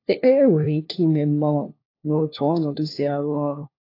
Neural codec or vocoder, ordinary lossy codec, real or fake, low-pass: codec, 16 kHz, 1 kbps, FunCodec, trained on LibriTTS, 50 frames a second; AAC, 32 kbps; fake; 5.4 kHz